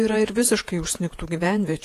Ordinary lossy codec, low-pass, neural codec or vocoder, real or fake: AAC, 48 kbps; 14.4 kHz; vocoder, 48 kHz, 128 mel bands, Vocos; fake